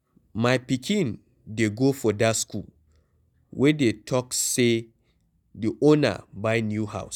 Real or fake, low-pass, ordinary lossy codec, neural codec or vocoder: real; 19.8 kHz; none; none